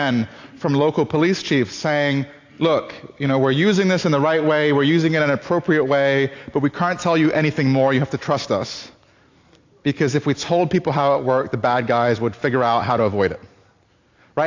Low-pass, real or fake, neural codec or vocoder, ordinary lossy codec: 7.2 kHz; real; none; AAC, 48 kbps